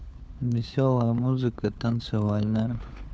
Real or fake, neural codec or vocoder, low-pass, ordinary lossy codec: fake; codec, 16 kHz, 4 kbps, FunCodec, trained on LibriTTS, 50 frames a second; none; none